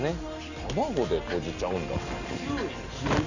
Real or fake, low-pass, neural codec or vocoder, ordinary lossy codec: real; 7.2 kHz; none; none